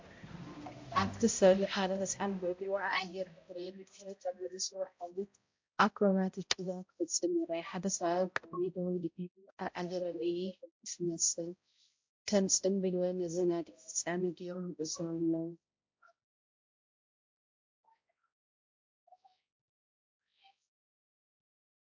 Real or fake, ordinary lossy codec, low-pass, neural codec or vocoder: fake; MP3, 48 kbps; 7.2 kHz; codec, 16 kHz, 0.5 kbps, X-Codec, HuBERT features, trained on balanced general audio